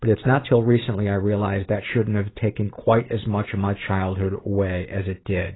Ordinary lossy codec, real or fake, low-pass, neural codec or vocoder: AAC, 16 kbps; fake; 7.2 kHz; vocoder, 44.1 kHz, 128 mel bands every 512 samples, BigVGAN v2